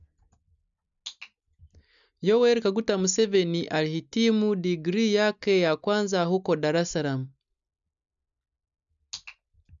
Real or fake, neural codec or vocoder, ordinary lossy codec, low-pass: real; none; none; 7.2 kHz